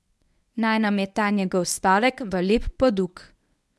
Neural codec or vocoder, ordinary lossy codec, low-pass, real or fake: codec, 24 kHz, 0.9 kbps, WavTokenizer, medium speech release version 1; none; none; fake